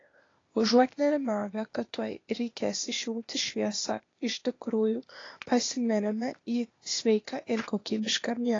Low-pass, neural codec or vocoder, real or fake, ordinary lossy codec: 7.2 kHz; codec, 16 kHz, 0.8 kbps, ZipCodec; fake; AAC, 32 kbps